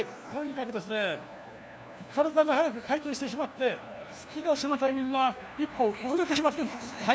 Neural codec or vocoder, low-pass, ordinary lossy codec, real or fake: codec, 16 kHz, 1 kbps, FunCodec, trained on LibriTTS, 50 frames a second; none; none; fake